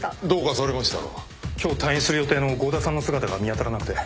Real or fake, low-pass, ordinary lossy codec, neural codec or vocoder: real; none; none; none